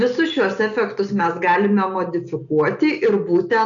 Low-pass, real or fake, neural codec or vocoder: 7.2 kHz; real; none